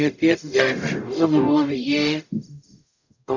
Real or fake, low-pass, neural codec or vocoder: fake; 7.2 kHz; codec, 44.1 kHz, 0.9 kbps, DAC